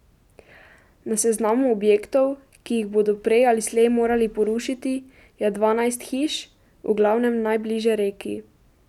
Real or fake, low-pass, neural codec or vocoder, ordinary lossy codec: real; 19.8 kHz; none; none